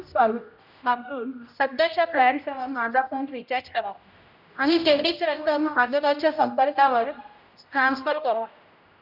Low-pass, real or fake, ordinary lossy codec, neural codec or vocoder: 5.4 kHz; fake; none; codec, 16 kHz, 0.5 kbps, X-Codec, HuBERT features, trained on general audio